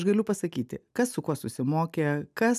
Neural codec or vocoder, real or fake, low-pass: none; real; 14.4 kHz